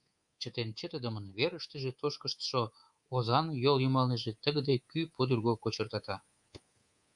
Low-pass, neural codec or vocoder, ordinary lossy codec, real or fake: 10.8 kHz; codec, 24 kHz, 3.1 kbps, DualCodec; Opus, 64 kbps; fake